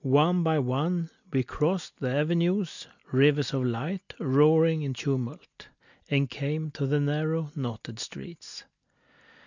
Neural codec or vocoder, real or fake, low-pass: none; real; 7.2 kHz